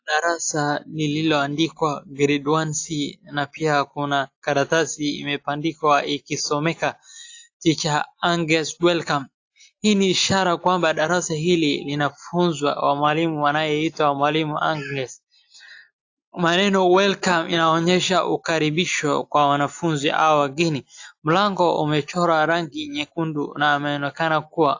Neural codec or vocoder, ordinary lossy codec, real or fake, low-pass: none; AAC, 48 kbps; real; 7.2 kHz